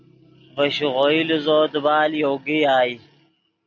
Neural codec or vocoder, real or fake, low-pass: none; real; 7.2 kHz